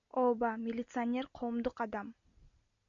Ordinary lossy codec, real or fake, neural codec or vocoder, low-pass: AAC, 64 kbps; real; none; 7.2 kHz